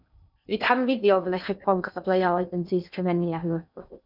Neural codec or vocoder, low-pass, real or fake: codec, 16 kHz in and 24 kHz out, 0.6 kbps, FocalCodec, streaming, 2048 codes; 5.4 kHz; fake